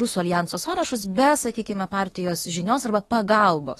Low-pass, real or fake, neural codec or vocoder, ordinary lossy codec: 19.8 kHz; fake; autoencoder, 48 kHz, 32 numbers a frame, DAC-VAE, trained on Japanese speech; AAC, 32 kbps